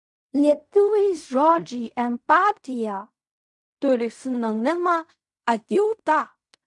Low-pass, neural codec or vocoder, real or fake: 10.8 kHz; codec, 16 kHz in and 24 kHz out, 0.4 kbps, LongCat-Audio-Codec, fine tuned four codebook decoder; fake